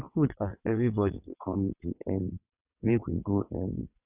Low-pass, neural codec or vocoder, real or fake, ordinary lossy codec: 3.6 kHz; vocoder, 22.05 kHz, 80 mel bands, WaveNeXt; fake; none